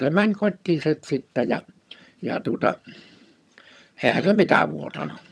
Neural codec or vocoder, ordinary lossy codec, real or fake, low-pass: vocoder, 22.05 kHz, 80 mel bands, HiFi-GAN; none; fake; none